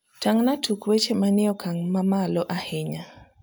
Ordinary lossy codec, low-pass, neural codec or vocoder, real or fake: none; none; none; real